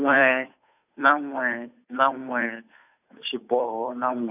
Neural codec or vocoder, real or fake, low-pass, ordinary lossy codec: codec, 16 kHz, 2 kbps, FunCodec, trained on Chinese and English, 25 frames a second; fake; 3.6 kHz; none